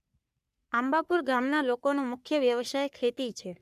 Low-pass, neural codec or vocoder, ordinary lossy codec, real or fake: 14.4 kHz; codec, 44.1 kHz, 3.4 kbps, Pupu-Codec; none; fake